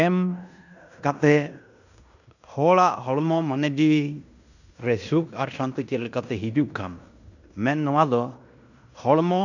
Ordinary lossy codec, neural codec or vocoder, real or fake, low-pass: none; codec, 16 kHz in and 24 kHz out, 0.9 kbps, LongCat-Audio-Codec, fine tuned four codebook decoder; fake; 7.2 kHz